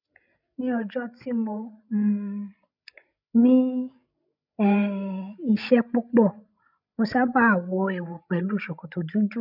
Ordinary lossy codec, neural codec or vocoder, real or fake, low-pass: none; codec, 16 kHz, 16 kbps, FreqCodec, larger model; fake; 5.4 kHz